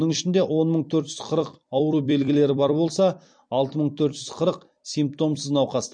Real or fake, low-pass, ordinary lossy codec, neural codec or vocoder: fake; 9.9 kHz; MP3, 48 kbps; vocoder, 44.1 kHz, 128 mel bands every 256 samples, BigVGAN v2